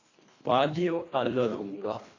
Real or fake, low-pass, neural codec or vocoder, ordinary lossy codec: fake; 7.2 kHz; codec, 24 kHz, 1.5 kbps, HILCodec; AAC, 32 kbps